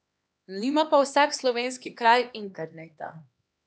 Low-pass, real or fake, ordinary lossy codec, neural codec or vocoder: none; fake; none; codec, 16 kHz, 2 kbps, X-Codec, HuBERT features, trained on LibriSpeech